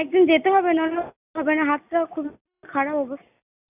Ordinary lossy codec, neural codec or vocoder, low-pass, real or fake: none; none; 3.6 kHz; real